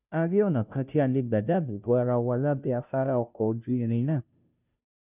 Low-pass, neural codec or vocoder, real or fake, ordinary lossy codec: 3.6 kHz; codec, 16 kHz, 0.5 kbps, FunCodec, trained on Chinese and English, 25 frames a second; fake; none